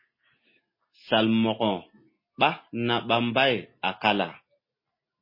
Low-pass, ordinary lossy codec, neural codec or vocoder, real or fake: 5.4 kHz; MP3, 24 kbps; none; real